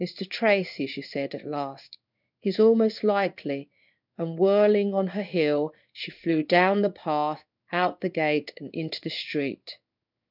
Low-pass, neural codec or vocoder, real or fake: 5.4 kHz; codec, 16 kHz in and 24 kHz out, 1 kbps, XY-Tokenizer; fake